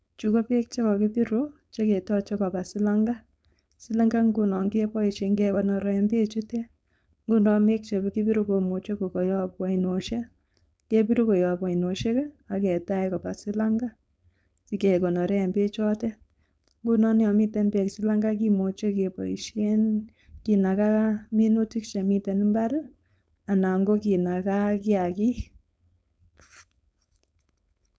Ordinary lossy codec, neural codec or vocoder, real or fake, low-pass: none; codec, 16 kHz, 4.8 kbps, FACodec; fake; none